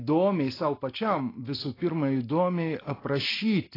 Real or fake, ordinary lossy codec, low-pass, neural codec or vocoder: real; AAC, 24 kbps; 5.4 kHz; none